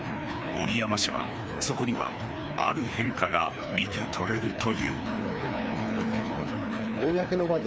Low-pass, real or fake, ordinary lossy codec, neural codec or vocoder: none; fake; none; codec, 16 kHz, 2 kbps, FreqCodec, larger model